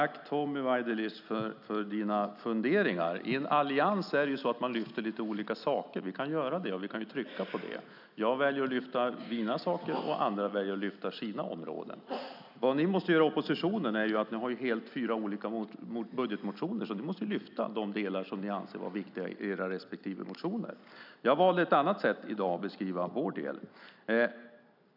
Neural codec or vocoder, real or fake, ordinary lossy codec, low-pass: none; real; none; 5.4 kHz